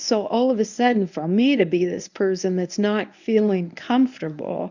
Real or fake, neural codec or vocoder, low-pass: fake; codec, 24 kHz, 0.9 kbps, WavTokenizer, medium speech release version 1; 7.2 kHz